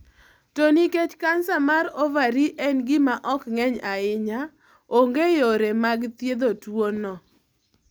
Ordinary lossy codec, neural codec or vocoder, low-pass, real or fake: none; none; none; real